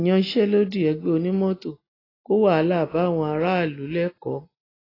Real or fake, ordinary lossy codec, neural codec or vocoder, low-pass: real; AAC, 24 kbps; none; 5.4 kHz